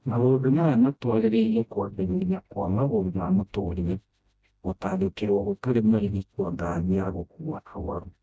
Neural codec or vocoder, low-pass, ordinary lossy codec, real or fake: codec, 16 kHz, 0.5 kbps, FreqCodec, smaller model; none; none; fake